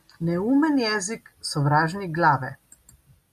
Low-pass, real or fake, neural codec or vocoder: 14.4 kHz; real; none